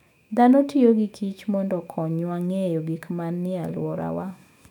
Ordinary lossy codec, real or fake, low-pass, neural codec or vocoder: none; fake; 19.8 kHz; autoencoder, 48 kHz, 128 numbers a frame, DAC-VAE, trained on Japanese speech